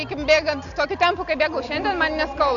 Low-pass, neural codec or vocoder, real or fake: 7.2 kHz; none; real